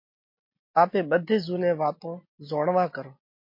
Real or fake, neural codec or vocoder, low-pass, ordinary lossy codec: real; none; 5.4 kHz; MP3, 32 kbps